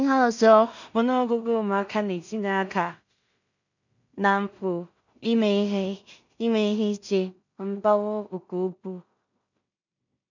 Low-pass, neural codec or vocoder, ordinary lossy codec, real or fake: 7.2 kHz; codec, 16 kHz in and 24 kHz out, 0.4 kbps, LongCat-Audio-Codec, two codebook decoder; none; fake